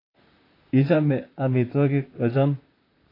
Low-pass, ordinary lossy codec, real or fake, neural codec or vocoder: 5.4 kHz; AAC, 24 kbps; fake; vocoder, 44.1 kHz, 128 mel bands, Pupu-Vocoder